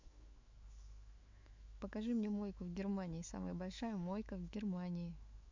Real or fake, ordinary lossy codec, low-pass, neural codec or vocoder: fake; MP3, 48 kbps; 7.2 kHz; autoencoder, 48 kHz, 128 numbers a frame, DAC-VAE, trained on Japanese speech